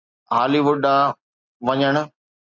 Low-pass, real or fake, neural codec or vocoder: 7.2 kHz; real; none